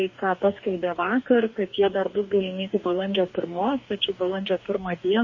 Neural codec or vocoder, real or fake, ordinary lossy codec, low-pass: codec, 44.1 kHz, 2.6 kbps, DAC; fake; MP3, 32 kbps; 7.2 kHz